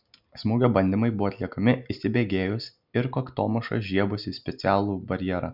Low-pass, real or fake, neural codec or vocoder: 5.4 kHz; real; none